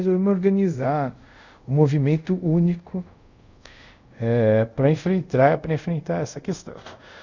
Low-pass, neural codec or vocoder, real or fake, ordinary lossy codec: 7.2 kHz; codec, 24 kHz, 0.5 kbps, DualCodec; fake; none